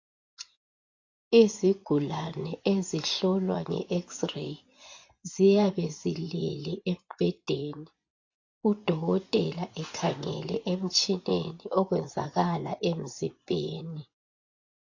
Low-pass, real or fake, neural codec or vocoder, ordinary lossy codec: 7.2 kHz; fake; vocoder, 24 kHz, 100 mel bands, Vocos; AAC, 48 kbps